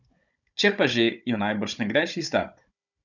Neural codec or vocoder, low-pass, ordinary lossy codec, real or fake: codec, 16 kHz, 16 kbps, FunCodec, trained on Chinese and English, 50 frames a second; 7.2 kHz; none; fake